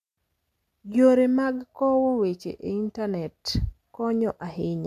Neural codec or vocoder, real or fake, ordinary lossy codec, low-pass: none; real; none; 14.4 kHz